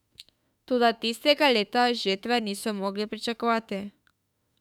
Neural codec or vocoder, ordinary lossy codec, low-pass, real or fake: autoencoder, 48 kHz, 32 numbers a frame, DAC-VAE, trained on Japanese speech; none; 19.8 kHz; fake